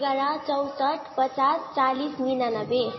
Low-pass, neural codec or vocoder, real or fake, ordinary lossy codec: 7.2 kHz; none; real; MP3, 24 kbps